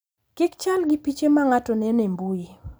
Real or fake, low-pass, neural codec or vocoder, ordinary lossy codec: real; none; none; none